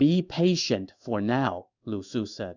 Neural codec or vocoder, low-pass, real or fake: codec, 16 kHz in and 24 kHz out, 1 kbps, XY-Tokenizer; 7.2 kHz; fake